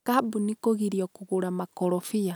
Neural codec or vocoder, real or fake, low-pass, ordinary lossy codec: none; real; none; none